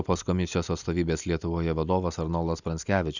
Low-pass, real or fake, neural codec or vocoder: 7.2 kHz; real; none